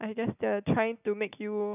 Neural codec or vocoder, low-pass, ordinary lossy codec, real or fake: none; 3.6 kHz; none; real